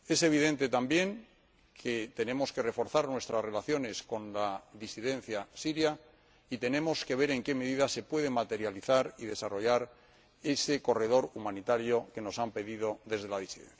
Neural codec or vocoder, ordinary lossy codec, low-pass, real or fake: none; none; none; real